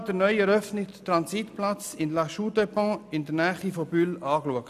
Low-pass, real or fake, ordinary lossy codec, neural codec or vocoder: 14.4 kHz; real; none; none